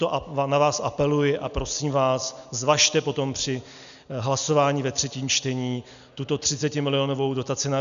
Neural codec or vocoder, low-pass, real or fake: none; 7.2 kHz; real